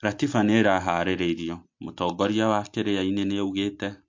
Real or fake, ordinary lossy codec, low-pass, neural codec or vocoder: real; MP3, 48 kbps; 7.2 kHz; none